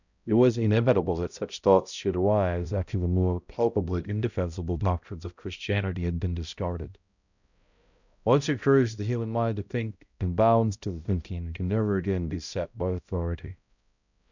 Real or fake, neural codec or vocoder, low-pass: fake; codec, 16 kHz, 0.5 kbps, X-Codec, HuBERT features, trained on balanced general audio; 7.2 kHz